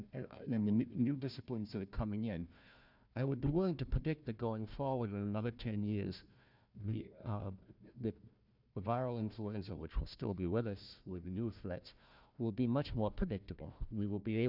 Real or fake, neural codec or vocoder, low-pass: fake; codec, 16 kHz, 1 kbps, FunCodec, trained on Chinese and English, 50 frames a second; 5.4 kHz